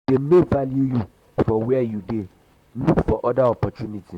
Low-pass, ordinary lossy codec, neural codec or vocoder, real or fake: 19.8 kHz; none; vocoder, 44.1 kHz, 128 mel bands, Pupu-Vocoder; fake